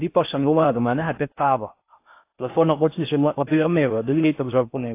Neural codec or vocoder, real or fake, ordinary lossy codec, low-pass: codec, 16 kHz in and 24 kHz out, 0.6 kbps, FocalCodec, streaming, 4096 codes; fake; AAC, 24 kbps; 3.6 kHz